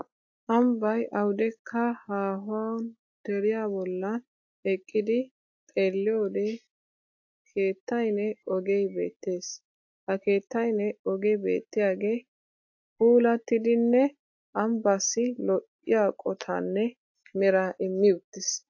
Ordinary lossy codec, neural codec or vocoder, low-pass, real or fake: AAC, 48 kbps; none; 7.2 kHz; real